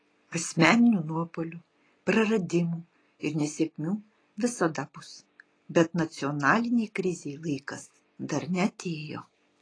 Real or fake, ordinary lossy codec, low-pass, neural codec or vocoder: real; AAC, 32 kbps; 9.9 kHz; none